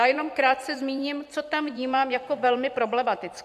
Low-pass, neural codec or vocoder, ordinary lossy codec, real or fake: 14.4 kHz; vocoder, 44.1 kHz, 128 mel bands every 512 samples, BigVGAN v2; AAC, 96 kbps; fake